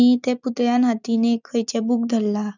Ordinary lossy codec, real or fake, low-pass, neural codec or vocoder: none; real; 7.2 kHz; none